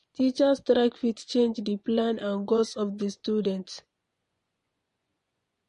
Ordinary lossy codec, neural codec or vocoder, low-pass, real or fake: MP3, 48 kbps; vocoder, 24 kHz, 100 mel bands, Vocos; 10.8 kHz; fake